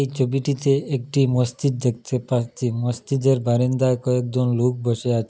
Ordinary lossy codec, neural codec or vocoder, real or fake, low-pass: none; none; real; none